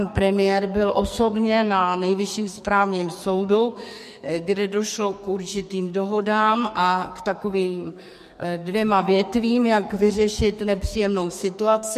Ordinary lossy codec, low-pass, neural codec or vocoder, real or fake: MP3, 64 kbps; 14.4 kHz; codec, 44.1 kHz, 2.6 kbps, SNAC; fake